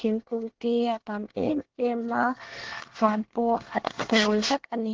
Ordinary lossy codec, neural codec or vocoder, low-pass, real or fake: Opus, 16 kbps; codec, 24 kHz, 1 kbps, SNAC; 7.2 kHz; fake